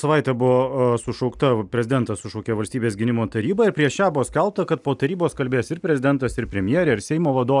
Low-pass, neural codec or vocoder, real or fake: 10.8 kHz; none; real